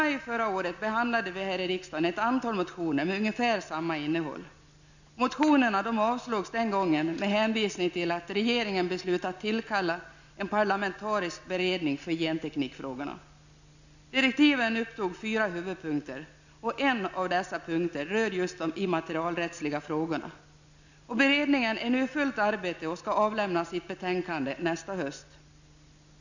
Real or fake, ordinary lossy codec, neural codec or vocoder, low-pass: real; none; none; 7.2 kHz